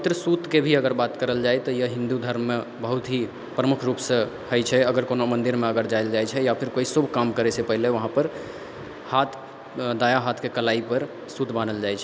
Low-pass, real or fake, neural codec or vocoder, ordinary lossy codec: none; real; none; none